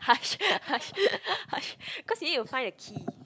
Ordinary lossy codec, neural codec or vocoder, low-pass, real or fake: none; none; none; real